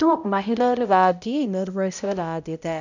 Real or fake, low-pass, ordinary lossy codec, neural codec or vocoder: fake; 7.2 kHz; none; codec, 16 kHz, 0.5 kbps, X-Codec, HuBERT features, trained on balanced general audio